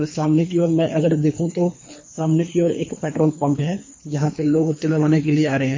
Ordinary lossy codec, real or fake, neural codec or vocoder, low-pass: MP3, 32 kbps; fake; codec, 24 kHz, 3 kbps, HILCodec; 7.2 kHz